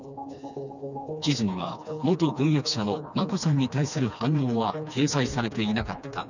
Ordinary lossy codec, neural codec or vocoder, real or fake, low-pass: none; codec, 16 kHz, 2 kbps, FreqCodec, smaller model; fake; 7.2 kHz